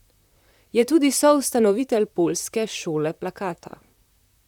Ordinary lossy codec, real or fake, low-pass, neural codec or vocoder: none; fake; 19.8 kHz; vocoder, 44.1 kHz, 128 mel bands, Pupu-Vocoder